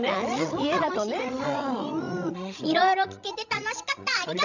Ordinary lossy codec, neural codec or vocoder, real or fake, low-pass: none; codec, 16 kHz, 16 kbps, FreqCodec, larger model; fake; 7.2 kHz